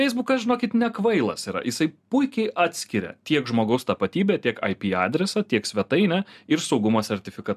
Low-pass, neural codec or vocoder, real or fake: 14.4 kHz; none; real